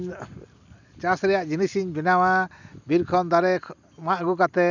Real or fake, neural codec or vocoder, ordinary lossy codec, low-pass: real; none; none; 7.2 kHz